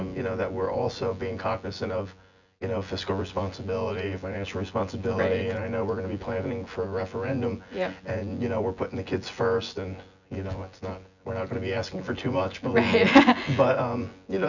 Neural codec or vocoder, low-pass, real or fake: vocoder, 24 kHz, 100 mel bands, Vocos; 7.2 kHz; fake